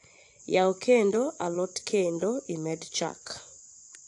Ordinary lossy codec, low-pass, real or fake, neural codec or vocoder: AAC, 64 kbps; 10.8 kHz; fake; vocoder, 24 kHz, 100 mel bands, Vocos